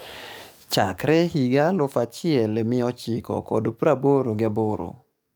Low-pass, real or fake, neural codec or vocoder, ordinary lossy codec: none; fake; codec, 44.1 kHz, 7.8 kbps, DAC; none